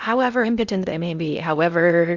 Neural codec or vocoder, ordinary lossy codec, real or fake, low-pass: codec, 16 kHz in and 24 kHz out, 0.6 kbps, FocalCodec, streaming, 2048 codes; Opus, 64 kbps; fake; 7.2 kHz